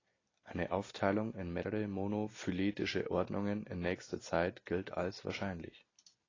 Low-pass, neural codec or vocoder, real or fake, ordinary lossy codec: 7.2 kHz; none; real; AAC, 32 kbps